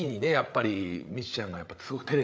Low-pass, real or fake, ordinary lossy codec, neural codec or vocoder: none; fake; none; codec, 16 kHz, 8 kbps, FreqCodec, larger model